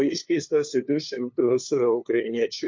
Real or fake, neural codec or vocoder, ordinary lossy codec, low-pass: fake; codec, 16 kHz, 2 kbps, FunCodec, trained on LibriTTS, 25 frames a second; MP3, 48 kbps; 7.2 kHz